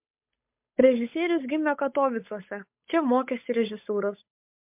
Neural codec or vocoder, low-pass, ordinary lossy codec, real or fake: codec, 16 kHz, 8 kbps, FunCodec, trained on Chinese and English, 25 frames a second; 3.6 kHz; MP3, 32 kbps; fake